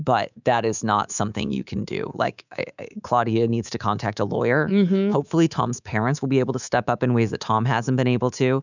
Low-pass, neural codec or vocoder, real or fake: 7.2 kHz; codec, 24 kHz, 3.1 kbps, DualCodec; fake